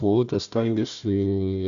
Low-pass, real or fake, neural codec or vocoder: 7.2 kHz; fake; codec, 16 kHz, 1 kbps, FunCodec, trained on Chinese and English, 50 frames a second